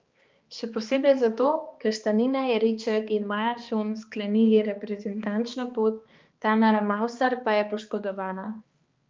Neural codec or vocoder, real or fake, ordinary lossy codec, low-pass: codec, 16 kHz, 2 kbps, X-Codec, HuBERT features, trained on balanced general audio; fake; Opus, 32 kbps; 7.2 kHz